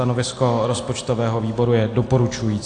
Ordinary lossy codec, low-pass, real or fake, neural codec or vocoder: AAC, 48 kbps; 9.9 kHz; real; none